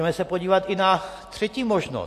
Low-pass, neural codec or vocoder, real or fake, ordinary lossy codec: 14.4 kHz; none; real; AAC, 64 kbps